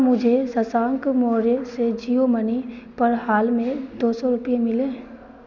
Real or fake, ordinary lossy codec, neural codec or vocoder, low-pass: real; none; none; 7.2 kHz